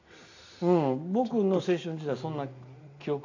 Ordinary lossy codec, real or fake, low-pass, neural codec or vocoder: AAC, 48 kbps; real; 7.2 kHz; none